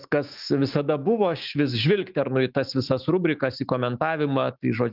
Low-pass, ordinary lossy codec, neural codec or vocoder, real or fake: 5.4 kHz; Opus, 32 kbps; autoencoder, 48 kHz, 128 numbers a frame, DAC-VAE, trained on Japanese speech; fake